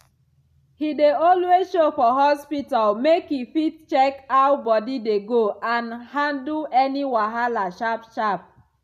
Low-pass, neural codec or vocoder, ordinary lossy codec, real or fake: 14.4 kHz; none; none; real